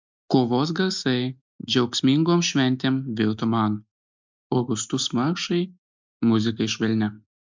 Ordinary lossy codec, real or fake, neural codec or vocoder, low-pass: MP3, 64 kbps; real; none; 7.2 kHz